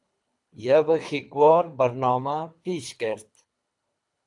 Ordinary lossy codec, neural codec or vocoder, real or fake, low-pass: AAC, 64 kbps; codec, 24 kHz, 3 kbps, HILCodec; fake; 10.8 kHz